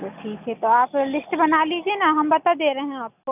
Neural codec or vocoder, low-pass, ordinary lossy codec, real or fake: none; 3.6 kHz; none; real